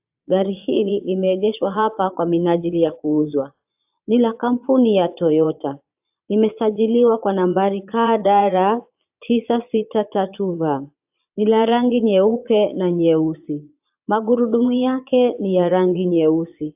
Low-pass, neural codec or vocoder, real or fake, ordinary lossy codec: 3.6 kHz; vocoder, 22.05 kHz, 80 mel bands, Vocos; fake; AAC, 32 kbps